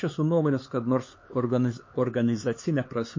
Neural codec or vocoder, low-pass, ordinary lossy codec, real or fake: codec, 16 kHz, 4 kbps, X-Codec, HuBERT features, trained on LibriSpeech; 7.2 kHz; MP3, 32 kbps; fake